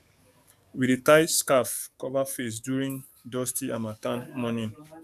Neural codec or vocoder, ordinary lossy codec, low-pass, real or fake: codec, 44.1 kHz, 7.8 kbps, DAC; none; 14.4 kHz; fake